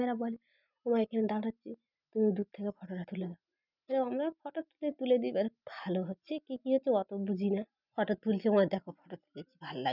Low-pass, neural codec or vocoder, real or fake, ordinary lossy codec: 5.4 kHz; none; real; none